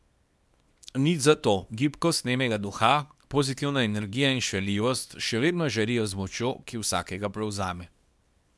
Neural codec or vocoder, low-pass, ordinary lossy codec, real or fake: codec, 24 kHz, 0.9 kbps, WavTokenizer, small release; none; none; fake